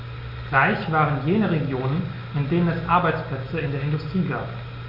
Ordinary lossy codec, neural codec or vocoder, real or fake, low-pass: none; none; real; 5.4 kHz